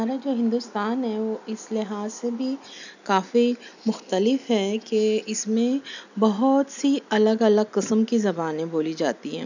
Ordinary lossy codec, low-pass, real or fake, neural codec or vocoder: none; 7.2 kHz; real; none